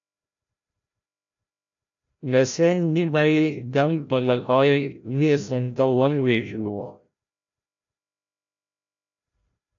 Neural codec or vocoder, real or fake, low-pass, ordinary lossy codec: codec, 16 kHz, 0.5 kbps, FreqCodec, larger model; fake; 7.2 kHz; AAC, 64 kbps